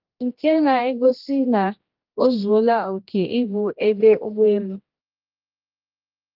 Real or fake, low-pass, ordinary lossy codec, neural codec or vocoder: fake; 5.4 kHz; Opus, 32 kbps; codec, 16 kHz, 1 kbps, X-Codec, HuBERT features, trained on general audio